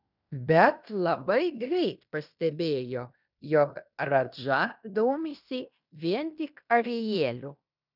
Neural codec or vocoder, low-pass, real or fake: codec, 16 kHz in and 24 kHz out, 0.9 kbps, LongCat-Audio-Codec, fine tuned four codebook decoder; 5.4 kHz; fake